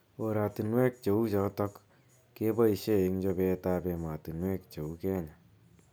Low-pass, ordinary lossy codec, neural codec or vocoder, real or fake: none; none; none; real